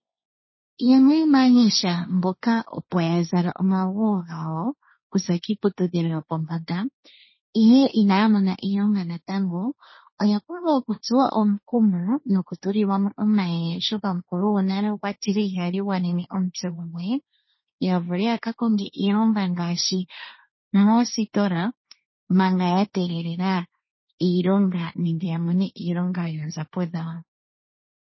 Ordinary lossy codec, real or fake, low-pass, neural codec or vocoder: MP3, 24 kbps; fake; 7.2 kHz; codec, 16 kHz, 1.1 kbps, Voila-Tokenizer